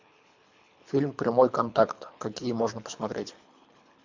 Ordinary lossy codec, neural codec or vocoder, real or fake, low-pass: MP3, 64 kbps; codec, 24 kHz, 3 kbps, HILCodec; fake; 7.2 kHz